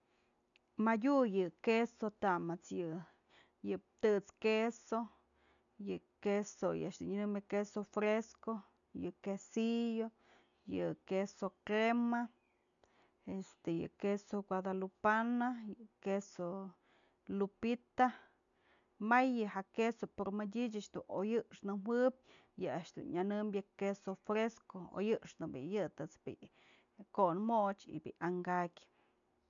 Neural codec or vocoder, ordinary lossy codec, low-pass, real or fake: none; none; 7.2 kHz; real